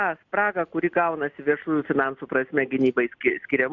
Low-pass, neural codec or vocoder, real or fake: 7.2 kHz; none; real